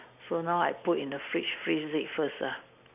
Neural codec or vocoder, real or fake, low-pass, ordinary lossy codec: none; real; 3.6 kHz; none